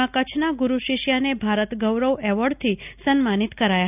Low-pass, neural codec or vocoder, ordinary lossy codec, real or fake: 3.6 kHz; none; none; real